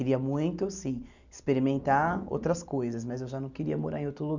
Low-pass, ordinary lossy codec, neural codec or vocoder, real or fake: 7.2 kHz; none; none; real